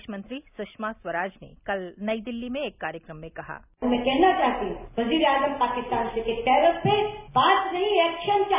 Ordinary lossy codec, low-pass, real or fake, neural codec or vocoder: none; 3.6 kHz; real; none